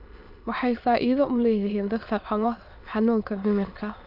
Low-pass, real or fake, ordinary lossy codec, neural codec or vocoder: 5.4 kHz; fake; none; autoencoder, 22.05 kHz, a latent of 192 numbers a frame, VITS, trained on many speakers